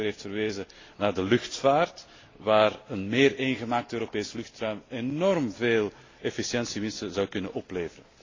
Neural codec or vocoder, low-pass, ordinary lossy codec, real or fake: none; 7.2 kHz; AAC, 32 kbps; real